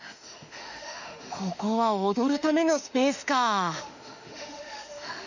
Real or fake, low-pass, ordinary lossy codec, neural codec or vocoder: fake; 7.2 kHz; none; autoencoder, 48 kHz, 32 numbers a frame, DAC-VAE, trained on Japanese speech